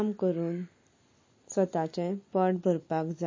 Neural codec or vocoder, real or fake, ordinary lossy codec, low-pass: none; real; MP3, 32 kbps; 7.2 kHz